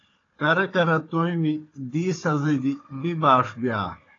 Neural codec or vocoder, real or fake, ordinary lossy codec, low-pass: codec, 16 kHz, 4 kbps, FunCodec, trained on Chinese and English, 50 frames a second; fake; AAC, 32 kbps; 7.2 kHz